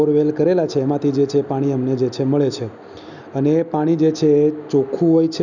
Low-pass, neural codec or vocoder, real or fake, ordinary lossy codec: 7.2 kHz; none; real; none